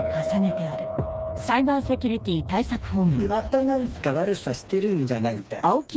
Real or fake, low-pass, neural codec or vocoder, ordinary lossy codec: fake; none; codec, 16 kHz, 2 kbps, FreqCodec, smaller model; none